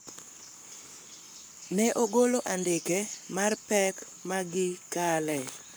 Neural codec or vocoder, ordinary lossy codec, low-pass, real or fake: codec, 44.1 kHz, 7.8 kbps, Pupu-Codec; none; none; fake